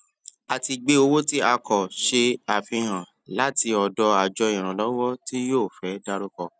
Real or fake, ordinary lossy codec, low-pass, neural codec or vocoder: real; none; none; none